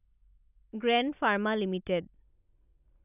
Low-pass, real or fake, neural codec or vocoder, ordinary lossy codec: 3.6 kHz; real; none; none